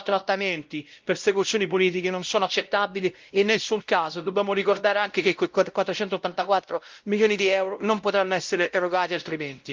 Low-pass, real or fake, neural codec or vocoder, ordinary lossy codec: 7.2 kHz; fake; codec, 16 kHz, 0.5 kbps, X-Codec, WavLM features, trained on Multilingual LibriSpeech; Opus, 32 kbps